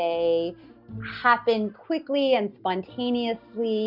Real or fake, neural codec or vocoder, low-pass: real; none; 5.4 kHz